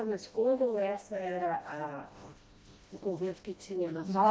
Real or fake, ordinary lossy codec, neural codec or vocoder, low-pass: fake; none; codec, 16 kHz, 1 kbps, FreqCodec, smaller model; none